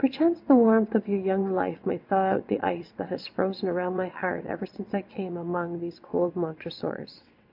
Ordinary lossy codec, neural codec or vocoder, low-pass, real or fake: MP3, 48 kbps; vocoder, 22.05 kHz, 80 mel bands, WaveNeXt; 5.4 kHz; fake